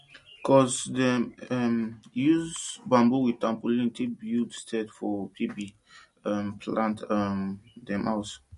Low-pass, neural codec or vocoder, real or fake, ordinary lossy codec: 14.4 kHz; none; real; MP3, 48 kbps